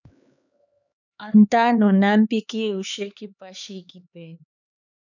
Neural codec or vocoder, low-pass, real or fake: codec, 16 kHz, 4 kbps, X-Codec, HuBERT features, trained on LibriSpeech; 7.2 kHz; fake